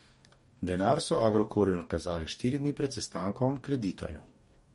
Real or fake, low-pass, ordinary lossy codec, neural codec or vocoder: fake; 19.8 kHz; MP3, 48 kbps; codec, 44.1 kHz, 2.6 kbps, DAC